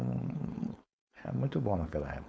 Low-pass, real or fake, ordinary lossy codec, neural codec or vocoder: none; fake; none; codec, 16 kHz, 4.8 kbps, FACodec